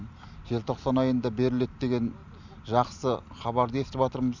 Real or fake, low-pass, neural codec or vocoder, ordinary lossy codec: real; 7.2 kHz; none; none